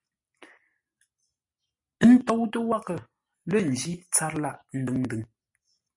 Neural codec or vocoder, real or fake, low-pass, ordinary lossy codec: none; real; 10.8 kHz; MP3, 48 kbps